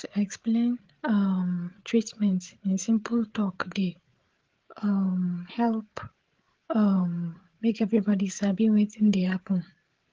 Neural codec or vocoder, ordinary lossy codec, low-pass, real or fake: codec, 16 kHz, 8 kbps, FreqCodec, larger model; Opus, 16 kbps; 7.2 kHz; fake